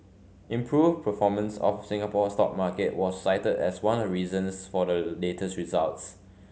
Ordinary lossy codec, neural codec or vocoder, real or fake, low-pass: none; none; real; none